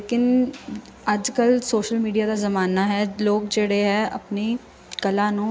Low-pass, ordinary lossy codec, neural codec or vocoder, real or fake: none; none; none; real